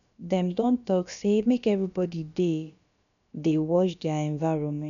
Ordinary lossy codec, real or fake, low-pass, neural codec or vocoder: none; fake; 7.2 kHz; codec, 16 kHz, about 1 kbps, DyCAST, with the encoder's durations